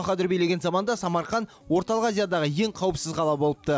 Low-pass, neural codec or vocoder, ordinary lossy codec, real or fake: none; none; none; real